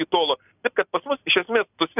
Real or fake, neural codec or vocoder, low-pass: real; none; 3.6 kHz